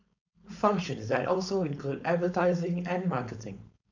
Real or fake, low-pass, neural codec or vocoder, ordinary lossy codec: fake; 7.2 kHz; codec, 16 kHz, 4.8 kbps, FACodec; none